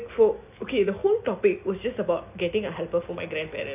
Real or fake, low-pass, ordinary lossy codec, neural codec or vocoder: real; 3.6 kHz; none; none